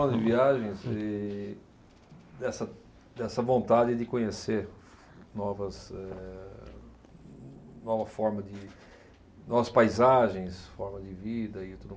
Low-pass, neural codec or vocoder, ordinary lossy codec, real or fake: none; none; none; real